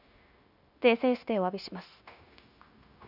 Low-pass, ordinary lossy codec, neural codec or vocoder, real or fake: 5.4 kHz; none; codec, 16 kHz, 0.9 kbps, LongCat-Audio-Codec; fake